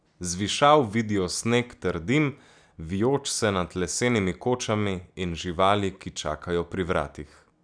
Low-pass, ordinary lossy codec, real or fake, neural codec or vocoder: 9.9 kHz; none; real; none